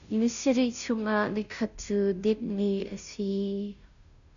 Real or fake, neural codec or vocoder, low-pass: fake; codec, 16 kHz, 0.5 kbps, FunCodec, trained on Chinese and English, 25 frames a second; 7.2 kHz